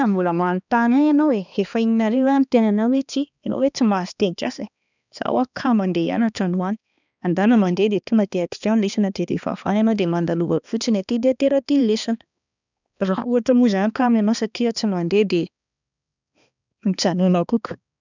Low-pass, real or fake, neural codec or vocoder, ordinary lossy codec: 7.2 kHz; fake; codec, 16 kHz, 2 kbps, X-Codec, HuBERT features, trained on balanced general audio; none